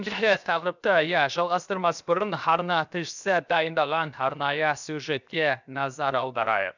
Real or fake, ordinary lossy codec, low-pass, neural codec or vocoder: fake; none; 7.2 kHz; codec, 16 kHz, 0.7 kbps, FocalCodec